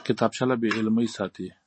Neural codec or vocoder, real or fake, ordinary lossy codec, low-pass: none; real; MP3, 32 kbps; 9.9 kHz